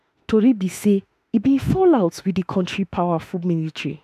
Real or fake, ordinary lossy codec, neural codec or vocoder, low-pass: fake; MP3, 96 kbps; autoencoder, 48 kHz, 32 numbers a frame, DAC-VAE, trained on Japanese speech; 14.4 kHz